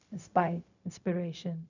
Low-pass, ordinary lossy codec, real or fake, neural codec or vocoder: 7.2 kHz; none; fake; codec, 16 kHz, 0.4 kbps, LongCat-Audio-Codec